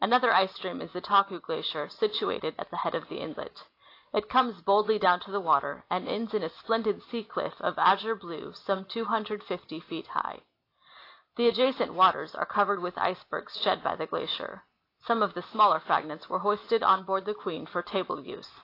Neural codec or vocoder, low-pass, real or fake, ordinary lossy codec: none; 5.4 kHz; real; AAC, 32 kbps